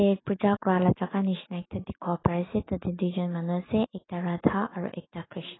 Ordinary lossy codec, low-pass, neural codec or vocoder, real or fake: AAC, 16 kbps; 7.2 kHz; autoencoder, 48 kHz, 128 numbers a frame, DAC-VAE, trained on Japanese speech; fake